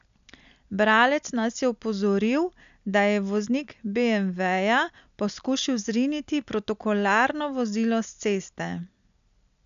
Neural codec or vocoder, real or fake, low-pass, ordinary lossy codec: none; real; 7.2 kHz; MP3, 96 kbps